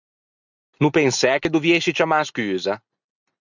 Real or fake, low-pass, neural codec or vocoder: real; 7.2 kHz; none